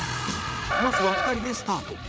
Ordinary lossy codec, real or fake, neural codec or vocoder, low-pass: none; fake; codec, 16 kHz, 8 kbps, FreqCodec, larger model; none